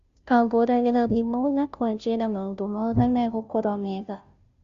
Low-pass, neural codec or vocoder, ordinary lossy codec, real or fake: 7.2 kHz; codec, 16 kHz, 0.5 kbps, FunCodec, trained on Chinese and English, 25 frames a second; Opus, 64 kbps; fake